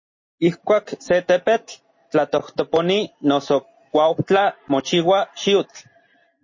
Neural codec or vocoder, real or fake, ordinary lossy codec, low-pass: none; real; MP3, 32 kbps; 7.2 kHz